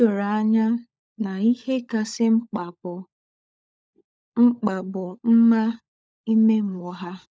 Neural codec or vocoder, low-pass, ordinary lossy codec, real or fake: codec, 16 kHz, 16 kbps, FunCodec, trained on LibriTTS, 50 frames a second; none; none; fake